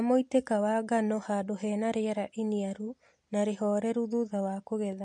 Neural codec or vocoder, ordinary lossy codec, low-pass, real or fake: none; MP3, 48 kbps; 10.8 kHz; real